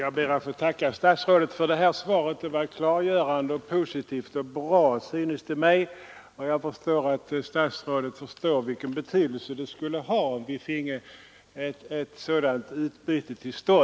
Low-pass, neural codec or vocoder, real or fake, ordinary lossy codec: none; none; real; none